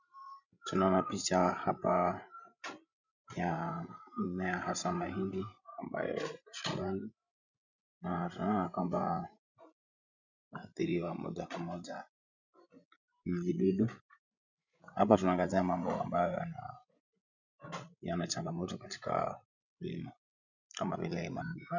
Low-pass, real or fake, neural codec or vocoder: 7.2 kHz; fake; codec, 16 kHz, 16 kbps, FreqCodec, larger model